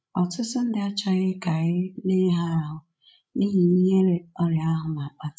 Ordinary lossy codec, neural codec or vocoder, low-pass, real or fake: none; codec, 16 kHz, 16 kbps, FreqCodec, larger model; none; fake